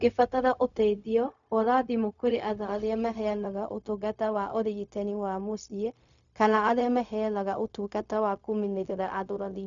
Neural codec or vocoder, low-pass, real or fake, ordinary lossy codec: codec, 16 kHz, 0.4 kbps, LongCat-Audio-Codec; 7.2 kHz; fake; none